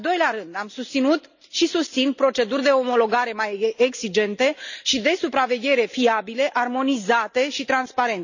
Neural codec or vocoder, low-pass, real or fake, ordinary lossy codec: none; 7.2 kHz; real; none